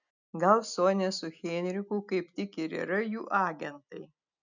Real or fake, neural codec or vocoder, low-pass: real; none; 7.2 kHz